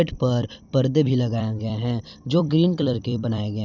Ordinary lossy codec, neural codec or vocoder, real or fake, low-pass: none; vocoder, 44.1 kHz, 128 mel bands every 256 samples, BigVGAN v2; fake; 7.2 kHz